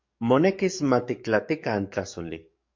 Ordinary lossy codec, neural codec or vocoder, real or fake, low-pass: MP3, 48 kbps; codec, 44.1 kHz, 7.8 kbps, DAC; fake; 7.2 kHz